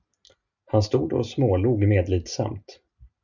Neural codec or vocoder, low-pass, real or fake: none; 7.2 kHz; real